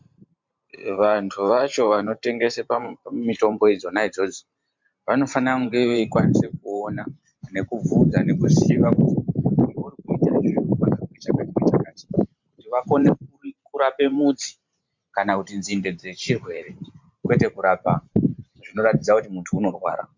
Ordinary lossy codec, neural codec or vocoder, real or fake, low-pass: MP3, 64 kbps; vocoder, 44.1 kHz, 128 mel bands every 512 samples, BigVGAN v2; fake; 7.2 kHz